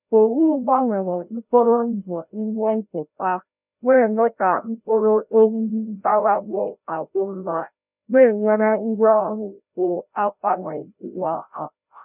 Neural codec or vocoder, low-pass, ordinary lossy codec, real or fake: codec, 16 kHz, 0.5 kbps, FreqCodec, larger model; 3.6 kHz; none; fake